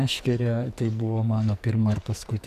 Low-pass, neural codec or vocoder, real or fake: 14.4 kHz; codec, 44.1 kHz, 2.6 kbps, SNAC; fake